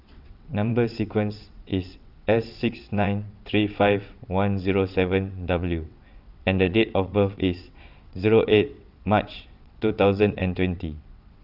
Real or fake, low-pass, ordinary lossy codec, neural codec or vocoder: fake; 5.4 kHz; none; vocoder, 22.05 kHz, 80 mel bands, WaveNeXt